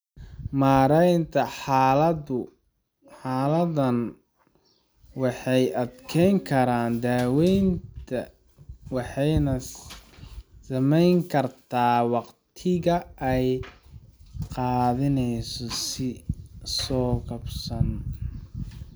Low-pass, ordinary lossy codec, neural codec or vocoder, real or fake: none; none; none; real